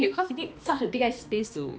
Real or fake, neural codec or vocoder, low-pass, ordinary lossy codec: fake; codec, 16 kHz, 2 kbps, X-Codec, HuBERT features, trained on balanced general audio; none; none